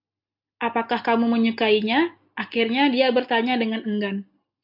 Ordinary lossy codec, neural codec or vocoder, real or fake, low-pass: MP3, 48 kbps; none; real; 5.4 kHz